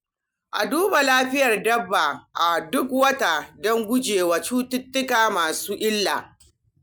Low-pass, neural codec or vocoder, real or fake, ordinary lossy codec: none; none; real; none